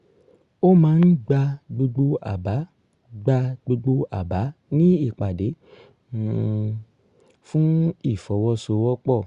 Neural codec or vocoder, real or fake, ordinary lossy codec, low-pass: none; real; Opus, 64 kbps; 10.8 kHz